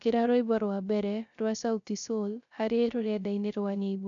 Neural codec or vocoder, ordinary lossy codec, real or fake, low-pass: codec, 16 kHz, 0.3 kbps, FocalCodec; none; fake; 7.2 kHz